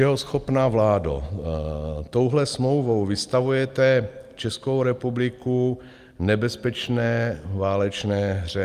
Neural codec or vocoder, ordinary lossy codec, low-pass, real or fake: none; Opus, 32 kbps; 14.4 kHz; real